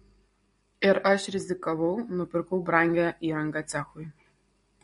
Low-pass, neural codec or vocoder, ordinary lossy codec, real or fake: 10.8 kHz; none; MP3, 48 kbps; real